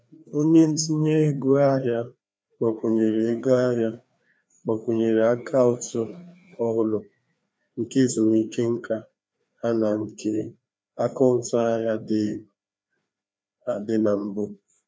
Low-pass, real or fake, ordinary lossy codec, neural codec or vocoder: none; fake; none; codec, 16 kHz, 2 kbps, FreqCodec, larger model